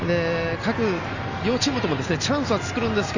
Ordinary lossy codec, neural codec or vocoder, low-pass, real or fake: none; none; 7.2 kHz; real